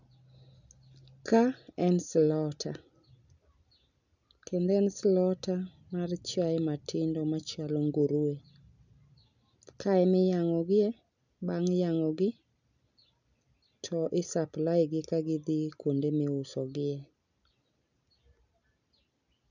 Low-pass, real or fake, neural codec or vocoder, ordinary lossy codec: 7.2 kHz; real; none; none